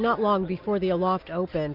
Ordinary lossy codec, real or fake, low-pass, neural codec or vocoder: MP3, 48 kbps; real; 5.4 kHz; none